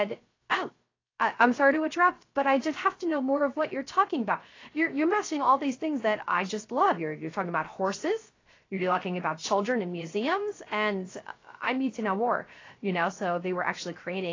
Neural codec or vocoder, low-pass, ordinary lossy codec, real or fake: codec, 16 kHz, 0.3 kbps, FocalCodec; 7.2 kHz; AAC, 32 kbps; fake